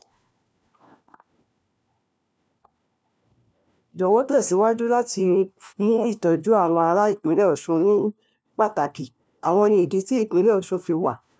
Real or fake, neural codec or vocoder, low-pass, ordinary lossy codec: fake; codec, 16 kHz, 1 kbps, FunCodec, trained on LibriTTS, 50 frames a second; none; none